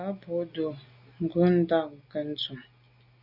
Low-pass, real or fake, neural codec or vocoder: 5.4 kHz; real; none